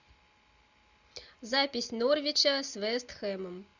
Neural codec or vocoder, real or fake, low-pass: none; real; 7.2 kHz